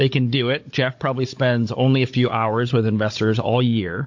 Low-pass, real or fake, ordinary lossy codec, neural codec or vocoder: 7.2 kHz; fake; MP3, 48 kbps; codec, 16 kHz, 16 kbps, FunCodec, trained on Chinese and English, 50 frames a second